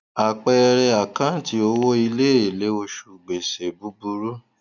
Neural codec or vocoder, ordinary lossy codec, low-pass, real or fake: none; none; 7.2 kHz; real